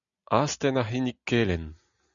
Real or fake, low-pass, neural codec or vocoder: real; 7.2 kHz; none